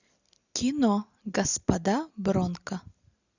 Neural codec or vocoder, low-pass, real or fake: none; 7.2 kHz; real